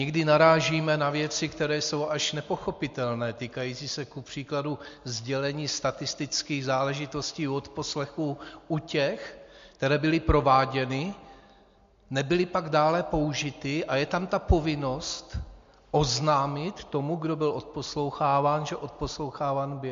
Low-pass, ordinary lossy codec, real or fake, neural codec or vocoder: 7.2 kHz; MP3, 48 kbps; real; none